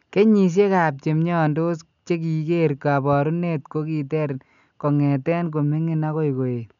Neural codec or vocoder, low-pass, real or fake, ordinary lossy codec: none; 7.2 kHz; real; none